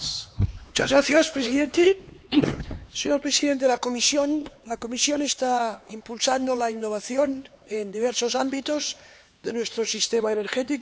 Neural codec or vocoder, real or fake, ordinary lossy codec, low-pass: codec, 16 kHz, 4 kbps, X-Codec, HuBERT features, trained on LibriSpeech; fake; none; none